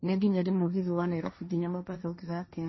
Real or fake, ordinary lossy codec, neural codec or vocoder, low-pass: fake; MP3, 24 kbps; codec, 16 kHz, 1.1 kbps, Voila-Tokenizer; 7.2 kHz